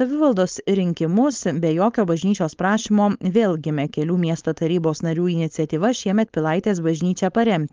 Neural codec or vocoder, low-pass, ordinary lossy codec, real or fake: codec, 16 kHz, 4.8 kbps, FACodec; 7.2 kHz; Opus, 32 kbps; fake